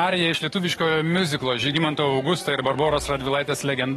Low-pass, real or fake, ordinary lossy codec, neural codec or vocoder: 19.8 kHz; fake; AAC, 32 kbps; codec, 44.1 kHz, 7.8 kbps, DAC